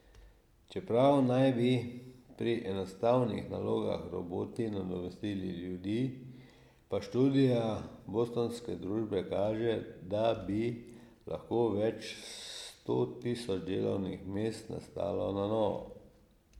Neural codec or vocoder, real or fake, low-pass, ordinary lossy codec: none; real; 19.8 kHz; MP3, 96 kbps